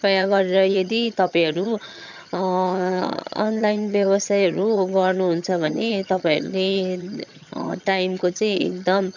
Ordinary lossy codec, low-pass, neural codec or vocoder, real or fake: none; 7.2 kHz; vocoder, 22.05 kHz, 80 mel bands, HiFi-GAN; fake